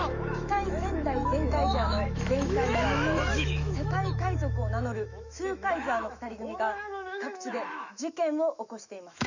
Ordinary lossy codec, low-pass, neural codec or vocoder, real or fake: none; 7.2 kHz; autoencoder, 48 kHz, 128 numbers a frame, DAC-VAE, trained on Japanese speech; fake